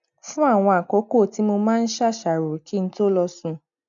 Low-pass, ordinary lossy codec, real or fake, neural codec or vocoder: 7.2 kHz; none; real; none